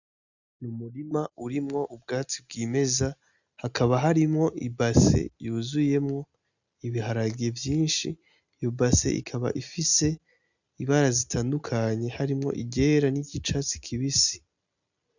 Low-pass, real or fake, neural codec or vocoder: 7.2 kHz; real; none